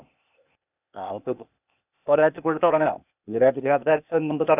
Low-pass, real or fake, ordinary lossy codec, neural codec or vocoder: 3.6 kHz; fake; none; codec, 16 kHz, 0.8 kbps, ZipCodec